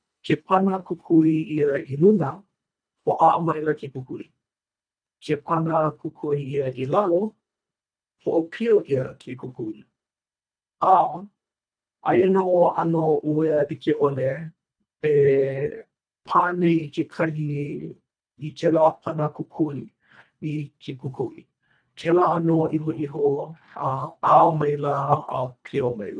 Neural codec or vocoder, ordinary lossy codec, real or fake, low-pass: codec, 24 kHz, 1.5 kbps, HILCodec; none; fake; 9.9 kHz